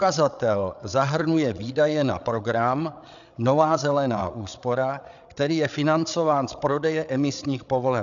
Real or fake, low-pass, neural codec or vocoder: fake; 7.2 kHz; codec, 16 kHz, 8 kbps, FreqCodec, larger model